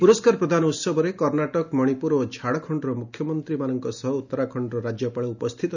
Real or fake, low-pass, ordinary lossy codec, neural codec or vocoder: real; 7.2 kHz; none; none